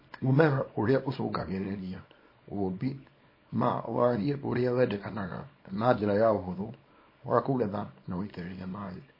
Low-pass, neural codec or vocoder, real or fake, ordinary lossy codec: 5.4 kHz; codec, 24 kHz, 0.9 kbps, WavTokenizer, small release; fake; MP3, 24 kbps